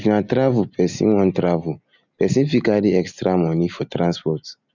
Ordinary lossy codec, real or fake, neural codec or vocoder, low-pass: none; real; none; 7.2 kHz